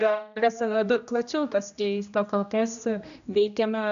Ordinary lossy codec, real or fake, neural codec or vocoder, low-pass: AAC, 96 kbps; fake; codec, 16 kHz, 1 kbps, X-Codec, HuBERT features, trained on general audio; 7.2 kHz